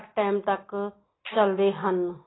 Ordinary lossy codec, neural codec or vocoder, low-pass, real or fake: AAC, 16 kbps; none; 7.2 kHz; real